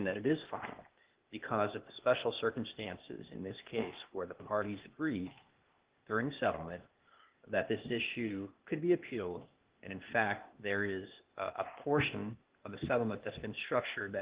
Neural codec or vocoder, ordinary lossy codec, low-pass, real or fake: codec, 16 kHz, 0.8 kbps, ZipCodec; Opus, 16 kbps; 3.6 kHz; fake